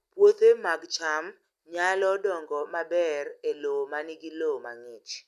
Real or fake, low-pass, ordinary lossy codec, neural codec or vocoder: real; 14.4 kHz; none; none